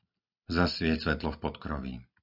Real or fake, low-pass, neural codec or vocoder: real; 5.4 kHz; none